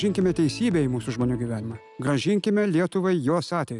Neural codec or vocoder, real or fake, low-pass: none; real; 10.8 kHz